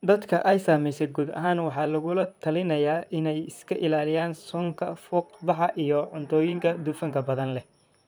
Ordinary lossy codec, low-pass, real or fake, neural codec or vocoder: none; none; fake; vocoder, 44.1 kHz, 128 mel bands, Pupu-Vocoder